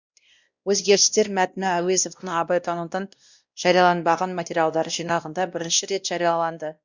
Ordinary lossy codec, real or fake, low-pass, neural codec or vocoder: Opus, 64 kbps; fake; 7.2 kHz; codec, 16 kHz, 1 kbps, X-Codec, WavLM features, trained on Multilingual LibriSpeech